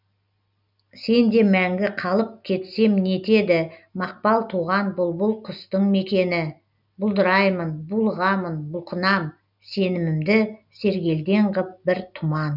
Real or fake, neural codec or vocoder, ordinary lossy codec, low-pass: real; none; none; 5.4 kHz